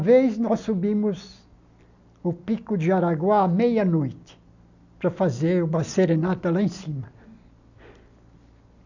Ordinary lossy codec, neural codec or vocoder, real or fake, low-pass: none; none; real; 7.2 kHz